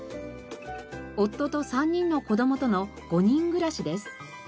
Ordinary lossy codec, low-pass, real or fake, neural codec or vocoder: none; none; real; none